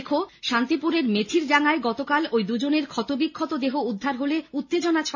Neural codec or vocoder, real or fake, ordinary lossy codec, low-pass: none; real; MP3, 64 kbps; 7.2 kHz